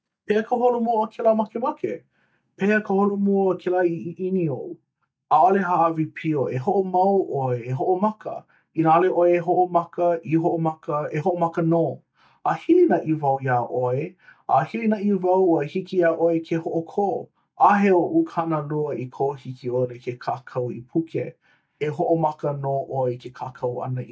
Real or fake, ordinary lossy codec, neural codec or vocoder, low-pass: real; none; none; none